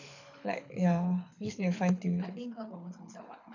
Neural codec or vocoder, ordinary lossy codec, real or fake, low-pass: codec, 24 kHz, 6 kbps, HILCodec; none; fake; 7.2 kHz